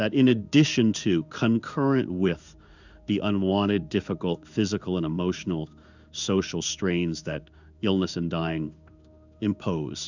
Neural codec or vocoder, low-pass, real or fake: codec, 16 kHz in and 24 kHz out, 1 kbps, XY-Tokenizer; 7.2 kHz; fake